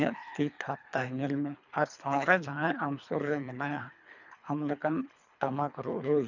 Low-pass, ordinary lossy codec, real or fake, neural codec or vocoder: 7.2 kHz; none; fake; codec, 24 kHz, 3 kbps, HILCodec